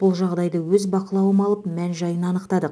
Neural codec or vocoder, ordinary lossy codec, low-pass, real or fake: none; none; none; real